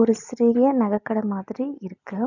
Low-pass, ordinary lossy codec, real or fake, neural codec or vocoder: 7.2 kHz; none; real; none